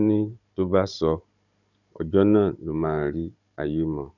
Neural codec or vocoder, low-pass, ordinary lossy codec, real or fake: codec, 16 kHz, 16 kbps, FunCodec, trained on Chinese and English, 50 frames a second; 7.2 kHz; none; fake